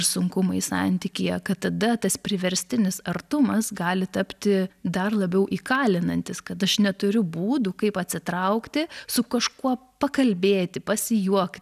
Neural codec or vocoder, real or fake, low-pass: none; real; 14.4 kHz